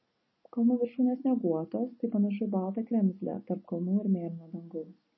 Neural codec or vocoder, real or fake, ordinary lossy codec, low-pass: none; real; MP3, 24 kbps; 7.2 kHz